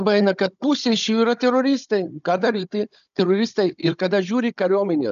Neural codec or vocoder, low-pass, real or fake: codec, 16 kHz, 16 kbps, FunCodec, trained on Chinese and English, 50 frames a second; 7.2 kHz; fake